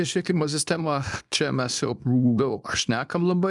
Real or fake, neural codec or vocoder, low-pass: fake; codec, 24 kHz, 0.9 kbps, WavTokenizer, small release; 10.8 kHz